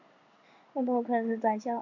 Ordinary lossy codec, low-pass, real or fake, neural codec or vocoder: MP3, 64 kbps; 7.2 kHz; fake; codec, 16 kHz in and 24 kHz out, 1 kbps, XY-Tokenizer